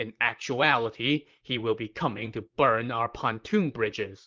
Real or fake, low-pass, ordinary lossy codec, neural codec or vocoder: fake; 7.2 kHz; Opus, 24 kbps; vocoder, 44.1 kHz, 128 mel bands, Pupu-Vocoder